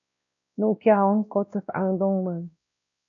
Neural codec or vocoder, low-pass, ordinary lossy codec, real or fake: codec, 16 kHz, 1 kbps, X-Codec, WavLM features, trained on Multilingual LibriSpeech; 7.2 kHz; MP3, 64 kbps; fake